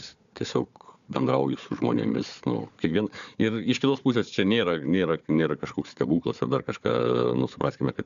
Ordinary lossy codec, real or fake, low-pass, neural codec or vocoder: MP3, 96 kbps; fake; 7.2 kHz; codec, 16 kHz, 4 kbps, FunCodec, trained on Chinese and English, 50 frames a second